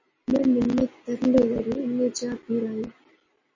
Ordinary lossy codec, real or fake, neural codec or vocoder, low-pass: MP3, 32 kbps; real; none; 7.2 kHz